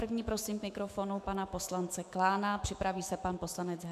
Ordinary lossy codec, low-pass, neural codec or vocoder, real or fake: MP3, 96 kbps; 14.4 kHz; autoencoder, 48 kHz, 128 numbers a frame, DAC-VAE, trained on Japanese speech; fake